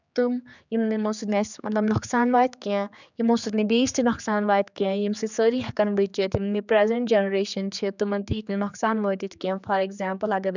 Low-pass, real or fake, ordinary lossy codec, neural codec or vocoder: 7.2 kHz; fake; none; codec, 16 kHz, 4 kbps, X-Codec, HuBERT features, trained on general audio